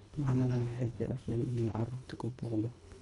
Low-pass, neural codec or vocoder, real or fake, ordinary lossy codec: 10.8 kHz; codec, 24 kHz, 1.5 kbps, HILCodec; fake; none